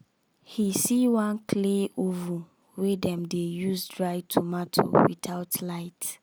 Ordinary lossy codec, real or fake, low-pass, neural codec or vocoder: none; real; none; none